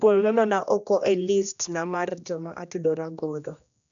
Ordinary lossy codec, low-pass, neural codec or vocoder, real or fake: MP3, 96 kbps; 7.2 kHz; codec, 16 kHz, 1 kbps, X-Codec, HuBERT features, trained on general audio; fake